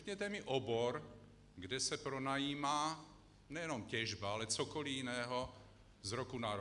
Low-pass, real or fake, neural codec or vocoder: 10.8 kHz; real; none